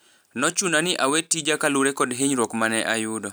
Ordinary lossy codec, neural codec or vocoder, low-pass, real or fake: none; none; none; real